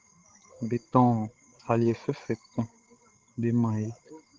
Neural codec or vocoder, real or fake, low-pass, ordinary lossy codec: codec, 16 kHz, 16 kbps, FreqCodec, larger model; fake; 7.2 kHz; Opus, 16 kbps